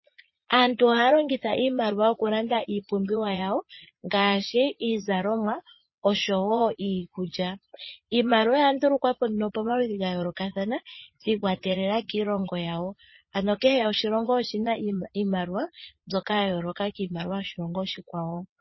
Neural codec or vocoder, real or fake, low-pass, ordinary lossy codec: vocoder, 22.05 kHz, 80 mel bands, Vocos; fake; 7.2 kHz; MP3, 24 kbps